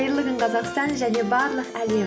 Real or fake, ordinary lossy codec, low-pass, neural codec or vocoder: real; none; none; none